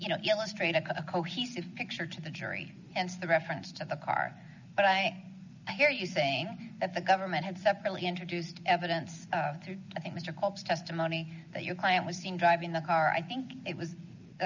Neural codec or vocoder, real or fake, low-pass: none; real; 7.2 kHz